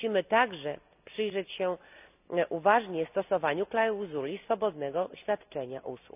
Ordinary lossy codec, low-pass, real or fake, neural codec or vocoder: none; 3.6 kHz; real; none